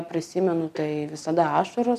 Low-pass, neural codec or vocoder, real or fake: 14.4 kHz; none; real